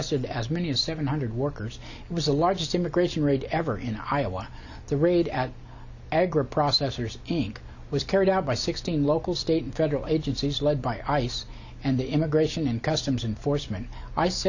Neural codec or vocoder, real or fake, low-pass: none; real; 7.2 kHz